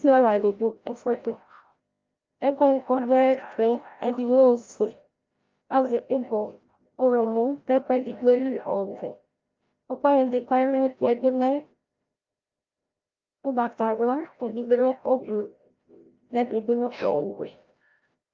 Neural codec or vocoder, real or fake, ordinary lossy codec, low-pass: codec, 16 kHz, 0.5 kbps, FreqCodec, larger model; fake; Opus, 24 kbps; 7.2 kHz